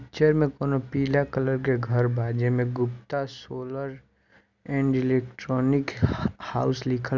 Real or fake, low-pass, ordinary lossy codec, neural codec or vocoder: real; 7.2 kHz; none; none